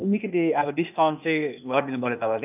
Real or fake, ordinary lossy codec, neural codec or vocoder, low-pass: fake; none; codec, 16 kHz, 0.8 kbps, ZipCodec; 3.6 kHz